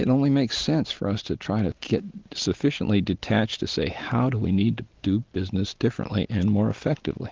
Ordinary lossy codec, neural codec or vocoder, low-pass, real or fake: Opus, 16 kbps; none; 7.2 kHz; real